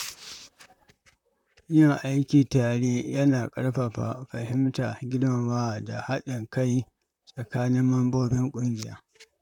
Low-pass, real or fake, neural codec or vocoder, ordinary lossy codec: 19.8 kHz; fake; vocoder, 44.1 kHz, 128 mel bands, Pupu-Vocoder; none